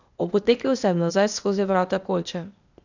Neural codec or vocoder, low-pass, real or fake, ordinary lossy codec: codec, 16 kHz, 0.8 kbps, ZipCodec; 7.2 kHz; fake; none